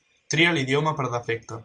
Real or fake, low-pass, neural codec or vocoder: real; 9.9 kHz; none